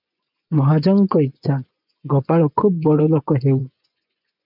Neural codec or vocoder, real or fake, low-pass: vocoder, 24 kHz, 100 mel bands, Vocos; fake; 5.4 kHz